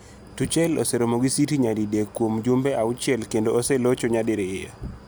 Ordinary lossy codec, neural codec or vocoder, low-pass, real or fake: none; none; none; real